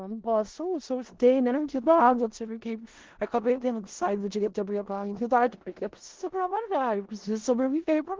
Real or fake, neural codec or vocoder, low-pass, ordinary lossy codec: fake; codec, 16 kHz in and 24 kHz out, 0.4 kbps, LongCat-Audio-Codec, four codebook decoder; 7.2 kHz; Opus, 16 kbps